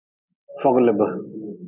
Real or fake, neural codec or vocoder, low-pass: real; none; 3.6 kHz